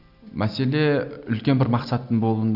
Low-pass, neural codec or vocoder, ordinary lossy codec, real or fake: 5.4 kHz; none; none; real